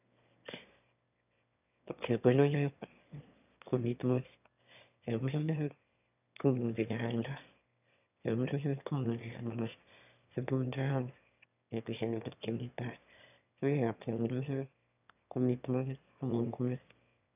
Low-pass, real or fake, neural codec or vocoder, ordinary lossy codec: 3.6 kHz; fake; autoencoder, 22.05 kHz, a latent of 192 numbers a frame, VITS, trained on one speaker; none